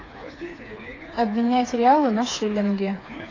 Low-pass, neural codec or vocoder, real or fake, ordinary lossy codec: 7.2 kHz; codec, 16 kHz, 4 kbps, FreqCodec, smaller model; fake; AAC, 32 kbps